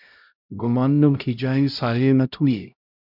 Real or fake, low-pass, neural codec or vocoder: fake; 5.4 kHz; codec, 16 kHz, 0.5 kbps, X-Codec, HuBERT features, trained on LibriSpeech